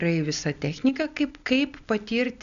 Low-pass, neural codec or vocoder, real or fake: 7.2 kHz; none; real